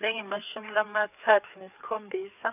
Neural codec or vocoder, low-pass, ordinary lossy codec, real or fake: codec, 16 kHz, 4 kbps, FreqCodec, larger model; 3.6 kHz; none; fake